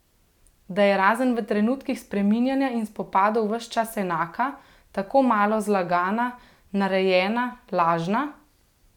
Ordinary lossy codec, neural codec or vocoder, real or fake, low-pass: none; none; real; 19.8 kHz